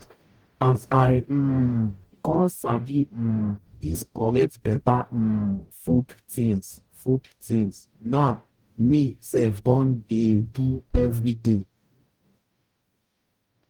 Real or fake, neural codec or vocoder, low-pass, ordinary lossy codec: fake; codec, 44.1 kHz, 0.9 kbps, DAC; 19.8 kHz; Opus, 24 kbps